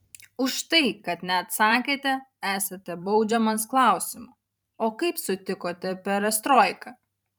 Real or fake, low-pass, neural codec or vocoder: fake; 19.8 kHz; vocoder, 44.1 kHz, 128 mel bands every 512 samples, BigVGAN v2